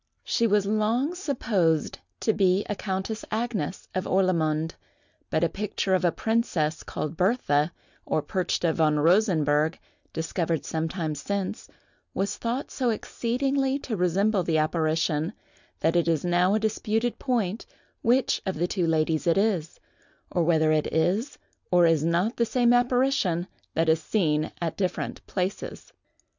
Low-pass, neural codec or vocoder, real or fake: 7.2 kHz; none; real